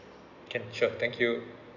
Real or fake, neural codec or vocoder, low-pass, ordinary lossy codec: real; none; 7.2 kHz; none